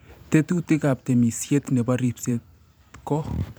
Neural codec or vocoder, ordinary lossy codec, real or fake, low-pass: vocoder, 44.1 kHz, 128 mel bands every 512 samples, BigVGAN v2; none; fake; none